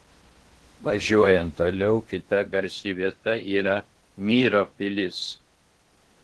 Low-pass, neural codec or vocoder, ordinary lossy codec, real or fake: 10.8 kHz; codec, 16 kHz in and 24 kHz out, 0.8 kbps, FocalCodec, streaming, 65536 codes; Opus, 16 kbps; fake